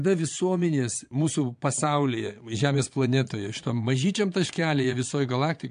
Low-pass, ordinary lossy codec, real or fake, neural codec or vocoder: 9.9 kHz; MP3, 64 kbps; fake; vocoder, 22.05 kHz, 80 mel bands, Vocos